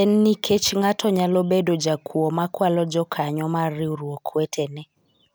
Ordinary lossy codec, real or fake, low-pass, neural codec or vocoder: none; real; none; none